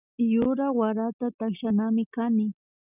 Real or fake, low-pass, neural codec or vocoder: real; 3.6 kHz; none